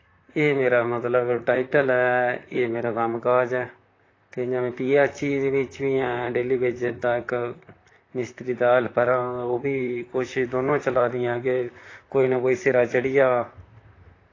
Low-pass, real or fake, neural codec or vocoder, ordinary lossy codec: 7.2 kHz; fake; vocoder, 44.1 kHz, 128 mel bands, Pupu-Vocoder; AAC, 32 kbps